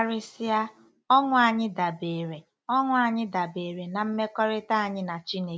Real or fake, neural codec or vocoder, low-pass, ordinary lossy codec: real; none; none; none